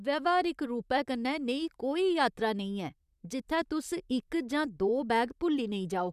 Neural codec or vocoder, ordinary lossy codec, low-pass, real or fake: vocoder, 44.1 kHz, 128 mel bands, Pupu-Vocoder; none; 14.4 kHz; fake